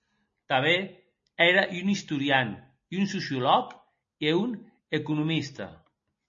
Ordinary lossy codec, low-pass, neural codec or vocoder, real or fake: MP3, 32 kbps; 7.2 kHz; none; real